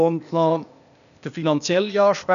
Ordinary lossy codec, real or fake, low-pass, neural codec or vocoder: none; fake; 7.2 kHz; codec, 16 kHz, 0.8 kbps, ZipCodec